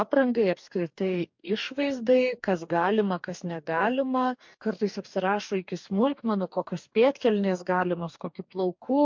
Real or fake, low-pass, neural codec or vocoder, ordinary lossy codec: fake; 7.2 kHz; codec, 44.1 kHz, 2.6 kbps, DAC; MP3, 48 kbps